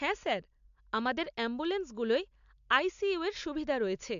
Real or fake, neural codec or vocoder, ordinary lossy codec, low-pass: real; none; AAC, 64 kbps; 7.2 kHz